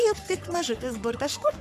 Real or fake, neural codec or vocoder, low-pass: fake; codec, 44.1 kHz, 3.4 kbps, Pupu-Codec; 14.4 kHz